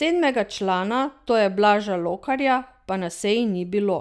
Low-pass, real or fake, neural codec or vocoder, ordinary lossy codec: none; real; none; none